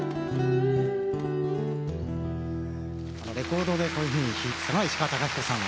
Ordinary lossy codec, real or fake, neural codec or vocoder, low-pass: none; real; none; none